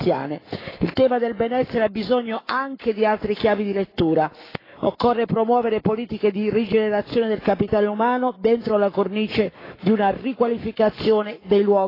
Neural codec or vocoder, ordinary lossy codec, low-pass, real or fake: codec, 44.1 kHz, 7.8 kbps, Pupu-Codec; AAC, 24 kbps; 5.4 kHz; fake